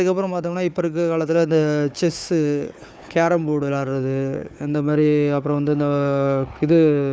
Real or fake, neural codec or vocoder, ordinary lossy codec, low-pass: fake; codec, 16 kHz, 4 kbps, FunCodec, trained on Chinese and English, 50 frames a second; none; none